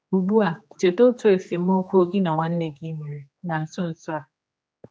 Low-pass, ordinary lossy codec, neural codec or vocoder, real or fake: none; none; codec, 16 kHz, 2 kbps, X-Codec, HuBERT features, trained on general audio; fake